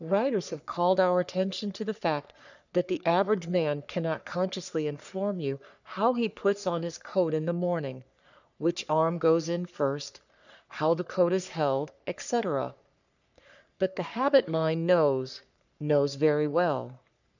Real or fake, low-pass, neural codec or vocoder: fake; 7.2 kHz; codec, 44.1 kHz, 3.4 kbps, Pupu-Codec